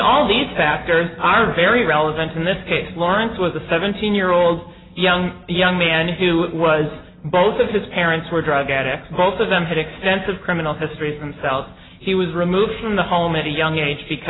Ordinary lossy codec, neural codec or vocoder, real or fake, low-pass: AAC, 16 kbps; none; real; 7.2 kHz